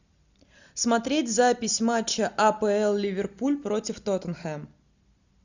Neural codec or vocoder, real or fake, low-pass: none; real; 7.2 kHz